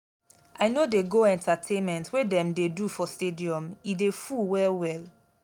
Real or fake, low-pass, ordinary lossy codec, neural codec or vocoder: real; 19.8 kHz; none; none